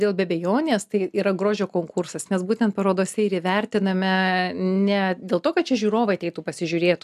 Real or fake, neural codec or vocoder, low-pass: real; none; 14.4 kHz